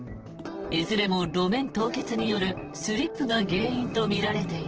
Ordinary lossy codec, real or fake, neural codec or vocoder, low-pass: Opus, 16 kbps; fake; vocoder, 44.1 kHz, 128 mel bands, Pupu-Vocoder; 7.2 kHz